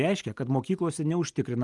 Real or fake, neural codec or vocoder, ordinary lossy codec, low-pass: real; none; Opus, 32 kbps; 10.8 kHz